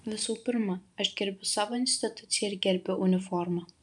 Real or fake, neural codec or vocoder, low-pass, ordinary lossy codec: fake; vocoder, 44.1 kHz, 128 mel bands every 512 samples, BigVGAN v2; 10.8 kHz; MP3, 96 kbps